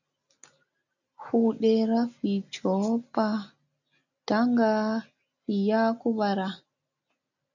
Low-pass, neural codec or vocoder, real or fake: 7.2 kHz; none; real